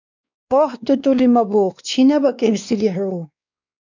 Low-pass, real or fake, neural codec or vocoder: 7.2 kHz; fake; codec, 16 kHz, 2 kbps, X-Codec, WavLM features, trained on Multilingual LibriSpeech